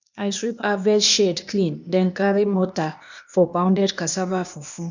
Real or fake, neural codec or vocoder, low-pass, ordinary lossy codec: fake; codec, 16 kHz, 0.8 kbps, ZipCodec; 7.2 kHz; none